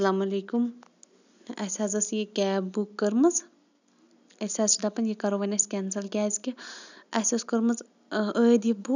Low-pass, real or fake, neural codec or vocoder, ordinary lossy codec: 7.2 kHz; real; none; none